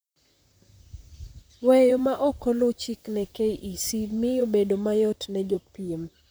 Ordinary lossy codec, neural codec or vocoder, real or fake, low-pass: none; vocoder, 44.1 kHz, 128 mel bands, Pupu-Vocoder; fake; none